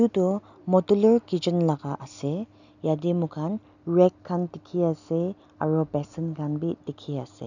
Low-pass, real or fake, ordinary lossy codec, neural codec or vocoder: 7.2 kHz; real; none; none